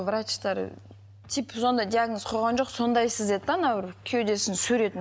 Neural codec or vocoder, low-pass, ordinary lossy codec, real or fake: none; none; none; real